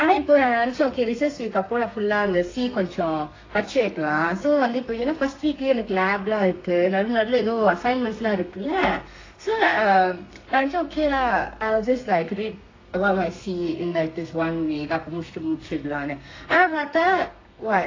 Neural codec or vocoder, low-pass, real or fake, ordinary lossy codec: codec, 32 kHz, 1.9 kbps, SNAC; 7.2 kHz; fake; AAC, 32 kbps